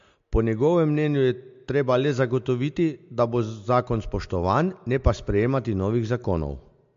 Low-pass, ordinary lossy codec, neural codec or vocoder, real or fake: 7.2 kHz; MP3, 48 kbps; none; real